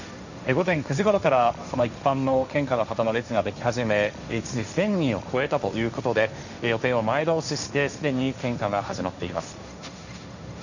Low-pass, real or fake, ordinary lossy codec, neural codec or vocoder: 7.2 kHz; fake; none; codec, 16 kHz, 1.1 kbps, Voila-Tokenizer